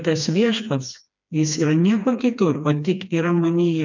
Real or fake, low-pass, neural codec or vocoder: fake; 7.2 kHz; codec, 16 kHz, 2 kbps, FreqCodec, smaller model